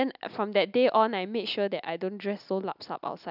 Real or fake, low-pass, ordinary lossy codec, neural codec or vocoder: real; 5.4 kHz; none; none